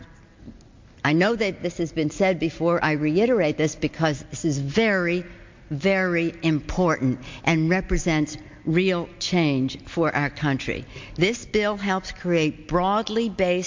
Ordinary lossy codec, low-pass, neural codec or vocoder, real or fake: MP3, 48 kbps; 7.2 kHz; none; real